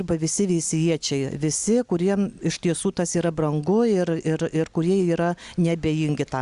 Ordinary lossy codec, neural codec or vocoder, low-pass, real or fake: Opus, 64 kbps; none; 10.8 kHz; real